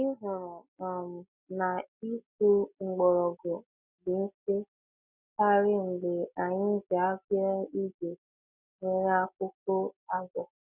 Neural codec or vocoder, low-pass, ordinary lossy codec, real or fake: none; 3.6 kHz; none; real